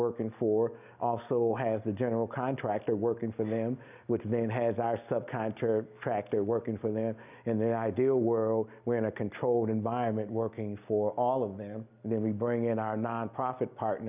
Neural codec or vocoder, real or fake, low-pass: none; real; 3.6 kHz